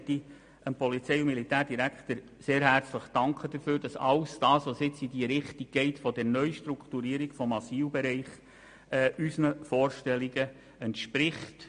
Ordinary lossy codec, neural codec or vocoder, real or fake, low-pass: MP3, 48 kbps; none; real; 9.9 kHz